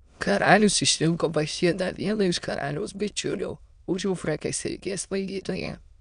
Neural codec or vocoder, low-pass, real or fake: autoencoder, 22.05 kHz, a latent of 192 numbers a frame, VITS, trained on many speakers; 9.9 kHz; fake